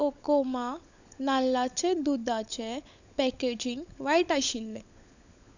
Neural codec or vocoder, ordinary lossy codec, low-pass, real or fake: codec, 16 kHz, 16 kbps, FunCodec, trained on LibriTTS, 50 frames a second; none; 7.2 kHz; fake